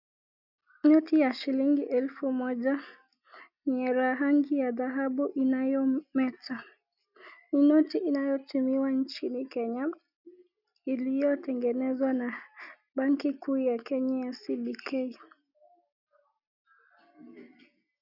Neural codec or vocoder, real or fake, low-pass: none; real; 5.4 kHz